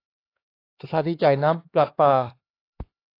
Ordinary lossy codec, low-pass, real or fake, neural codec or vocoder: AAC, 32 kbps; 5.4 kHz; fake; codec, 16 kHz, 2 kbps, X-Codec, HuBERT features, trained on LibriSpeech